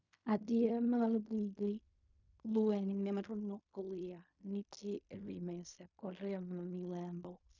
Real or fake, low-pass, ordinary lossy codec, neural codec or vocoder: fake; 7.2 kHz; none; codec, 16 kHz in and 24 kHz out, 0.4 kbps, LongCat-Audio-Codec, fine tuned four codebook decoder